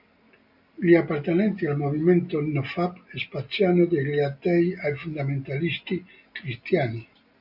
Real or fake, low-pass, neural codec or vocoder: real; 5.4 kHz; none